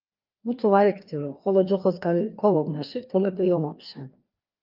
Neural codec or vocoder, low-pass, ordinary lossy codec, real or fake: codec, 16 kHz, 2 kbps, FreqCodec, larger model; 5.4 kHz; Opus, 32 kbps; fake